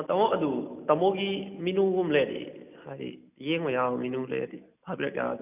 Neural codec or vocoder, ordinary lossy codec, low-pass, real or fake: none; none; 3.6 kHz; real